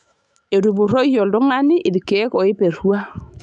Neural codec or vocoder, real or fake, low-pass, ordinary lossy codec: autoencoder, 48 kHz, 128 numbers a frame, DAC-VAE, trained on Japanese speech; fake; 10.8 kHz; none